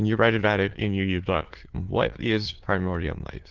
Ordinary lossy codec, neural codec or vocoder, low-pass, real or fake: Opus, 16 kbps; autoencoder, 22.05 kHz, a latent of 192 numbers a frame, VITS, trained on many speakers; 7.2 kHz; fake